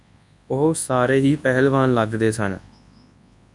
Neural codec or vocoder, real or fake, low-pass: codec, 24 kHz, 0.9 kbps, WavTokenizer, large speech release; fake; 10.8 kHz